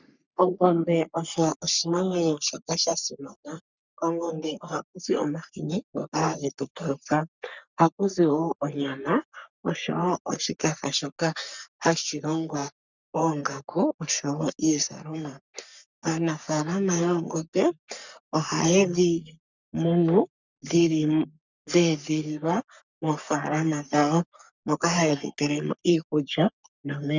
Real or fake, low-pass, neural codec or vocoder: fake; 7.2 kHz; codec, 44.1 kHz, 3.4 kbps, Pupu-Codec